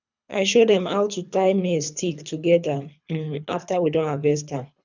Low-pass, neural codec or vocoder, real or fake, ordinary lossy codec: 7.2 kHz; codec, 24 kHz, 3 kbps, HILCodec; fake; none